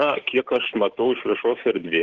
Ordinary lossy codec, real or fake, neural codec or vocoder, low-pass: Opus, 16 kbps; fake; codec, 16 kHz, 6 kbps, DAC; 7.2 kHz